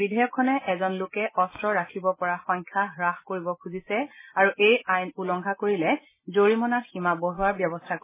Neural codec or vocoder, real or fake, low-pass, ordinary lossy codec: none; real; 3.6 kHz; MP3, 16 kbps